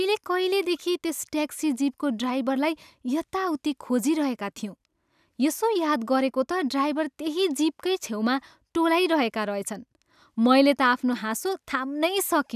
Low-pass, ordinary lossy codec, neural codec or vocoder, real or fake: 14.4 kHz; none; none; real